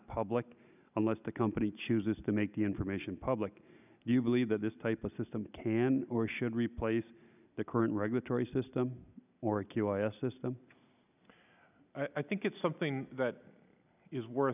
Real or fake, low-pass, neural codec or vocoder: real; 3.6 kHz; none